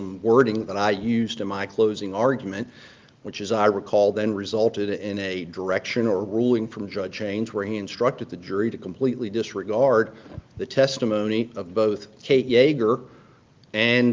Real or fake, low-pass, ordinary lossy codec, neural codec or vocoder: real; 7.2 kHz; Opus, 32 kbps; none